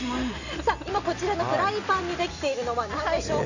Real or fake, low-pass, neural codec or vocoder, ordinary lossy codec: real; 7.2 kHz; none; none